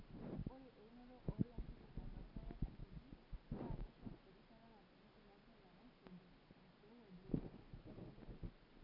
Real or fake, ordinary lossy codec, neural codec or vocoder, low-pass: real; none; none; 5.4 kHz